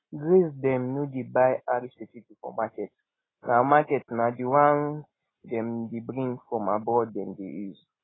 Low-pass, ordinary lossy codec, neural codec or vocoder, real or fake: 7.2 kHz; AAC, 16 kbps; none; real